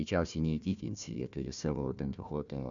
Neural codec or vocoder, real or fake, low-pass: codec, 16 kHz, 1 kbps, FunCodec, trained on Chinese and English, 50 frames a second; fake; 7.2 kHz